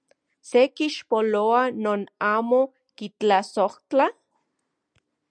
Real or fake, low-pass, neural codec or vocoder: real; 9.9 kHz; none